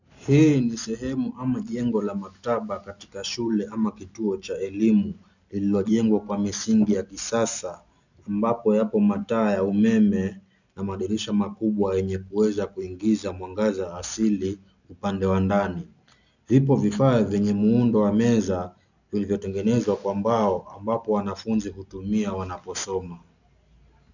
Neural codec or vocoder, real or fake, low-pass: none; real; 7.2 kHz